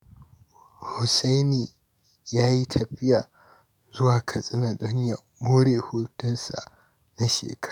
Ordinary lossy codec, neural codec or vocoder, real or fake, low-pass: none; codec, 44.1 kHz, 7.8 kbps, DAC; fake; 19.8 kHz